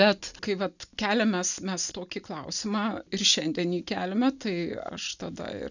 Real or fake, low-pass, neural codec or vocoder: real; 7.2 kHz; none